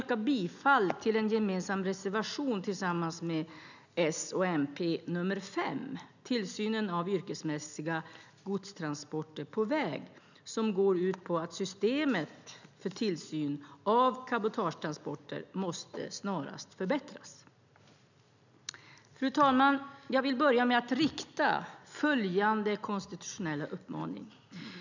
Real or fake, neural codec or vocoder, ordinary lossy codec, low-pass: real; none; none; 7.2 kHz